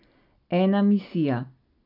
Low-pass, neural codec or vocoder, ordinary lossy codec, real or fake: 5.4 kHz; none; none; real